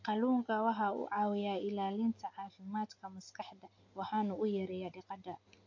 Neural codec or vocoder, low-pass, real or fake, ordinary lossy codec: none; 7.2 kHz; real; AAC, 48 kbps